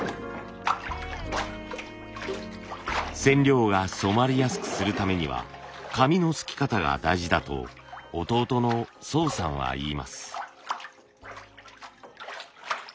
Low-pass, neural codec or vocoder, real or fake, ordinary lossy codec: none; none; real; none